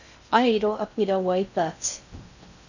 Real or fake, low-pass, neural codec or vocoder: fake; 7.2 kHz; codec, 16 kHz in and 24 kHz out, 0.6 kbps, FocalCodec, streaming, 2048 codes